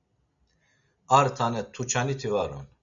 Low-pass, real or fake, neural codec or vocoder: 7.2 kHz; real; none